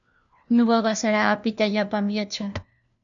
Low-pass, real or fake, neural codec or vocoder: 7.2 kHz; fake; codec, 16 kHz, 1 kbps, FunCodec, trained on LibriTTS, 50 frames a second